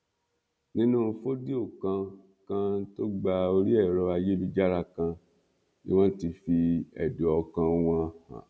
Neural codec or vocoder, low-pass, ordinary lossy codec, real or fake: none; none; none; real